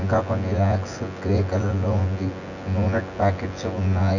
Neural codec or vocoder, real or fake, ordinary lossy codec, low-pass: vocoder, 24 kHz, 100 mel bands, Vocos; fake; none; 7.2 kHz